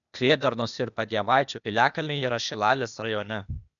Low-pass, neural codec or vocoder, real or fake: 7.2 kHz; codec, 16 kHz, 0.8 kbps, ZipCodec; fake